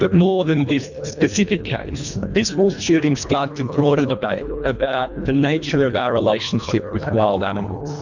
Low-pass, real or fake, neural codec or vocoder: 7.2 kHz; fake; codec, 24 kHz, 1.5 kbps, HILCodec